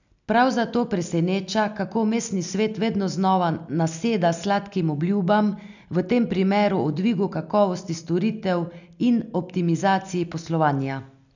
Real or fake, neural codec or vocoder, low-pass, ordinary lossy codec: real; none; 7.2 kHz; none